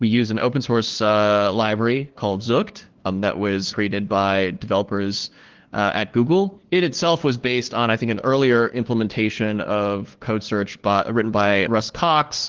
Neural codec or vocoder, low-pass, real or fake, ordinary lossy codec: codec, 16 kHz, 2 kbps, FunCodec, trained on LibriTTS, 25 frames a second; 7.2 kHz; fake; Opus, 16 kbps